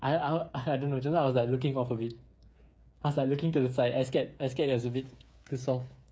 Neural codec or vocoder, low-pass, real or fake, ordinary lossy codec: codec, 16 kHz, 8 kbps, FreqCodec, smaller model; none; fake; none